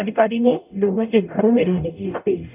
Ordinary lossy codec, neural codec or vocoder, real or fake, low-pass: none; codec, 44.1 kHz, 0.9 kbps, DAC; fake; 3.6 kHz